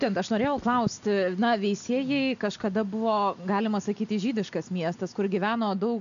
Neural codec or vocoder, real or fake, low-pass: none; real; 7.2 kHz